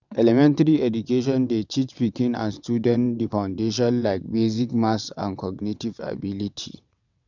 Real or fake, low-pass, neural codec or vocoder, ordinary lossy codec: fake; 7.2 kHz; vocoder, 22.05 kHz, 80 mel bands, WaveNeXt; none